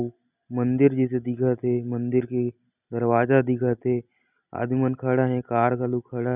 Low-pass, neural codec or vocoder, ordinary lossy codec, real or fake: 3.6 kHz; none; none; real